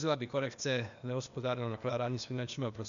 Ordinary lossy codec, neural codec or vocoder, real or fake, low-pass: AAC, 96 kbps; codec, 16 kHz, 0.8 kbps, ZipCodec; fake; 7.2 kHz